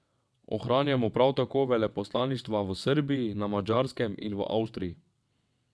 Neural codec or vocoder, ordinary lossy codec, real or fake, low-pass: vocoder, 22.05 kHz, 80 mel bands, WaveNeXt; none; fake; none